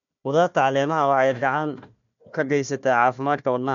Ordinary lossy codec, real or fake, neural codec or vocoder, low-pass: none; fake; codec, 16 kHz, 1 kbps, FunCodec, trained on Chinese and English, 50 frames a second; 7.2 kHz